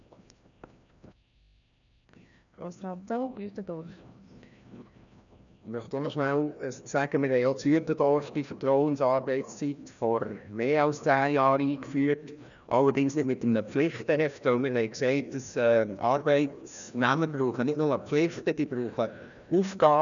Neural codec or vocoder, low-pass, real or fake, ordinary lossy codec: codec, 16 kHz, 1 kbps, FreqCodec, larger model; 7.2 kHz; fake; none